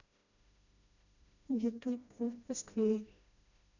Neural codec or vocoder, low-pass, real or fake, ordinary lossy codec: codec, 16 kHz, 1 kbps, FreqCodec, smaller model; 7.2 kHz; fake; none